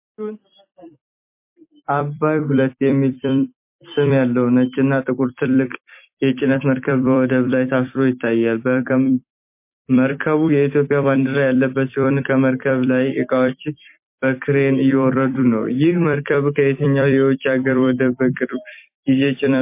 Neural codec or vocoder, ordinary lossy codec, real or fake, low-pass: vocoder, 44.1 kHz, 128 mel bands every 256 samples, BigVGAN v2; MP3, 24 kbps; fake; 3.6 kHz